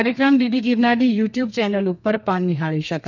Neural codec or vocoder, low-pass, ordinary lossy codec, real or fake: codec, 32 kHz, 1.9 kbps, SNAC; 7.2 kHz; none; fake